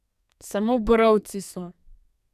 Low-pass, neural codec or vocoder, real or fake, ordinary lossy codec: 14.4 kHz; codec, 32 kHz, 1.9 kbps, SNAC; fake; none